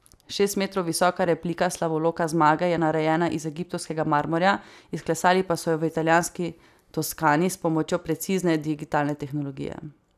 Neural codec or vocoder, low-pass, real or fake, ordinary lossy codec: none; 14.4 kHz; real; none